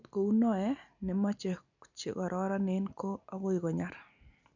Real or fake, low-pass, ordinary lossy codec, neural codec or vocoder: real; 7.2 kHz; none; none